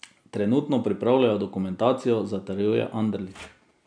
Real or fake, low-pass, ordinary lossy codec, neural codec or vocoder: real; 9.9 kHz; none; none